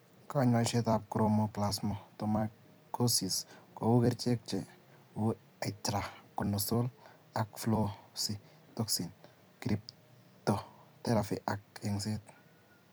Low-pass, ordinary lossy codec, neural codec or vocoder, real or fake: none; none; vocoder, 44.1 kHz, 128 mel bands every 256 samples, BigVGAN v2; fake